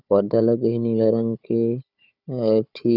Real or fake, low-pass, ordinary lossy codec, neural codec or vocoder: fake; 5.4 kHz; none; codec, 16 kHz, 4 kbps, FunCodec, trained on Chinese and English, 50 frames a second